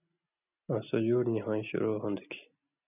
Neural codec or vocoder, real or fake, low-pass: none; real; 3.6 kHz